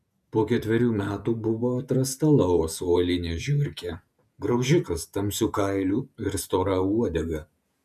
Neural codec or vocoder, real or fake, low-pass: vocoder, 48 kHz, 128 mel bands, Vocos; fake; 14.4 kHz